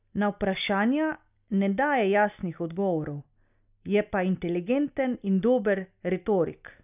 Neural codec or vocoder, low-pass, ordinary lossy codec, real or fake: none; 3.6 kHz; none; real